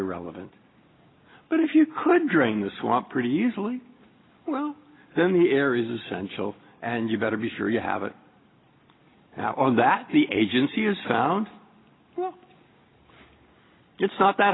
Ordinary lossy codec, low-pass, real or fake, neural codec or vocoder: AAC, 16 kbps; 7.2 kHz; real; none